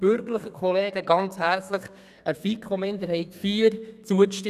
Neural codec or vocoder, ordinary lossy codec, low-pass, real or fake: codec, 32 kHz, 1.9 kbps, SNAC; none; 14.4 kHz; fake